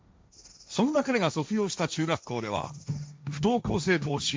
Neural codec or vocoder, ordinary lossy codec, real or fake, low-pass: codec, 16 kHz, 1.1 kbps, Voila-Tokenizer; none; fake; none